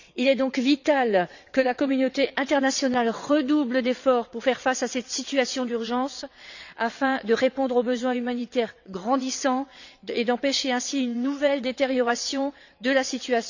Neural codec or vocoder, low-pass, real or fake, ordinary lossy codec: vocoder, 22.05 kHz, 80 mel bands, WaveNeXt; 7.2 kHz; fake; none